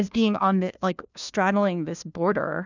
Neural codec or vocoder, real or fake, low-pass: codec, 16 kHz, 1 kbps, FunCodec, trained on LibriTTS, 50 frames a second; fake; 7.2 kHz